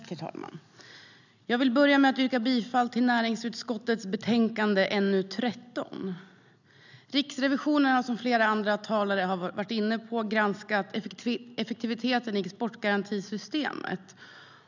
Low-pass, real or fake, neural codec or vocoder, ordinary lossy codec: 7.2 kHz; real; none; none